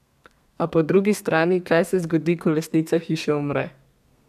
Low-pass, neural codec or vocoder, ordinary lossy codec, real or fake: 14.4 kHz; codec, 32 kHz, 1.9 kbps, SNAC; none; fake